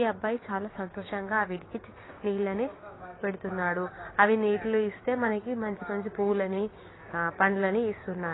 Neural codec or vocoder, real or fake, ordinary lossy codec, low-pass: codec, 16 kHz, 6 kbps, DAC; fake; AAC, 16 kbps; 7.2 kHz